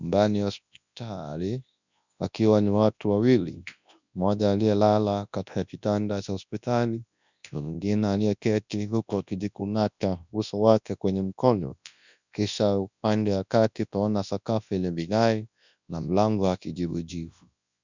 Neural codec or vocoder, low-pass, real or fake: codec, 24 kHz, 0.9 kbps, WavTokenizer, large speech release; 7.2 kHz; fake